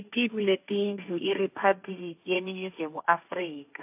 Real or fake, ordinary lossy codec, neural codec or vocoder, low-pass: fake; AAC, 32 kbps; codec, 16 kHz, 1.1 kbps, Voila-Tokenizer; 3.6 kHz